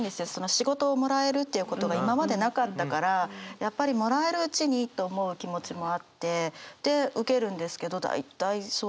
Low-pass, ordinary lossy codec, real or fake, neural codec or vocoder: none; none; real; none